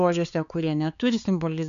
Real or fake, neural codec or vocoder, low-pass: fake; codec, 16 kHz, 4 kbps, X-Codec, HuBERT features, trained on balanced general audio; 7.2 kHz